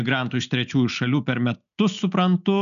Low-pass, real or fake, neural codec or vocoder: 7.2 kHz; real; none